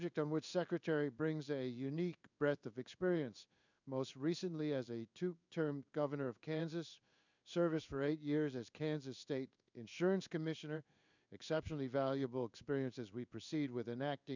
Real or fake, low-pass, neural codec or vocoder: fake; 7.2 kHz; codec, 16 kHz in and 24 kHz out, 1 kbps, XY-Tokenizer